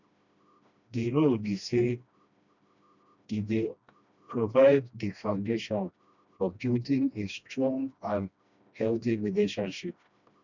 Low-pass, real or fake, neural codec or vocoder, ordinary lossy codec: 7.2 kHz; fake; codec, 16 kHz, 1 kbps, FreqCodec, smaller model; none